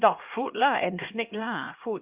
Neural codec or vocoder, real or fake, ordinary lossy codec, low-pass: codec, 16 kHz, 1 kbps, X-Codec, HuBERT features, trained on LibriSpeech; fake; Opus, 32 kbps; 3.6 kHz